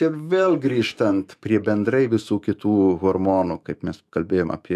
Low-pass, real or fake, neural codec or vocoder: 14.4 kHz; fake; autoencoder, 48 kHz, 128 numbers a frame, DAC-VAE, trained on Japanese speech